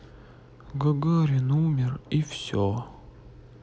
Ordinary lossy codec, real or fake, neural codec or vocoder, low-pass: none; real; none; none